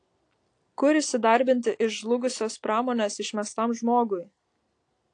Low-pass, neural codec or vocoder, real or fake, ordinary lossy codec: 9.9 kHz; none; real; AAC, 48 kbps